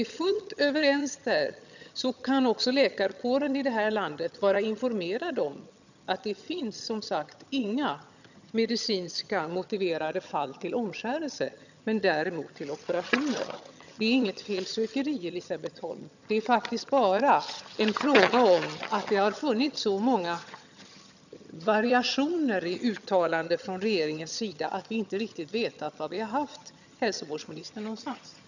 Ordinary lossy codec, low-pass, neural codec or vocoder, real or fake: none; 7.2 kHz; vocoder, 22.05 kHz, 80 mel bands, HiFi-GAN; fake